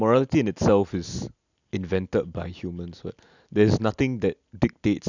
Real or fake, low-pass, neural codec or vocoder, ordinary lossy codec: real; 7.2 kHz; none; none